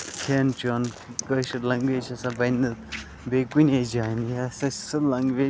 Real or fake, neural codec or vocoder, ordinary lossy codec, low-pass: real; none; none; none